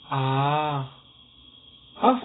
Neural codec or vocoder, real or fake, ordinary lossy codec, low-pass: none; real; AAC, 16 kbps; 7.2 kHz